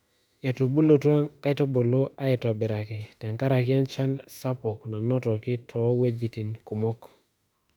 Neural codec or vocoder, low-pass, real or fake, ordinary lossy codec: autoencoder, 48 kHz, 32 numbers a frame, DAC-VAE, trained on Japanese speech; 19.8 kHz; fake; none